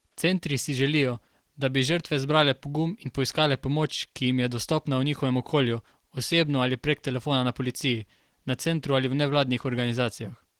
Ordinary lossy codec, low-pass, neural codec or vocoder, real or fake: Opus, 16 kbps; 19.8 kHz; none; real